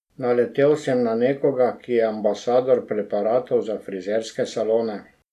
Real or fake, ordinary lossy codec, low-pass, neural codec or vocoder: real; none; 14.4 kHz; none